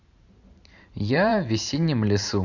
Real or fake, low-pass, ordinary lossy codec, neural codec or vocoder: fake; 7.2 kHz; none; vocoder, 44.1 kHz, 128 mel bands every 512 samples, BigVGAN v2